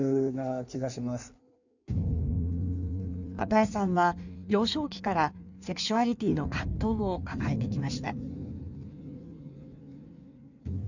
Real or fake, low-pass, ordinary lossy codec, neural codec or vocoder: fake; 7.2 kHz; none; codec, 16 kHz in and 24 kHz out, 1.1 kbps, FireRedTTS-2 codec